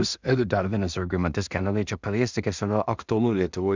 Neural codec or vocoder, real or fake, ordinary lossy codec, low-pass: codec, 16 kHz in and 24 kHz out, 0.4 kbps, LongCat-Audio-Codec, two codebook decoder; fake; Opus, 64 kbps; 7.2 kHz